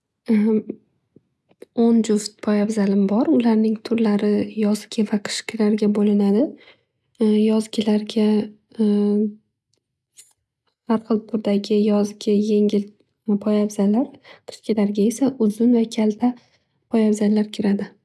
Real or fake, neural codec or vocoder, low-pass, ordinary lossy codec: real; none; none; none